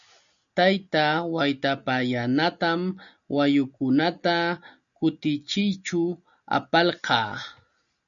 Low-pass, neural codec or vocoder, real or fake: 7.2 kHz; none; real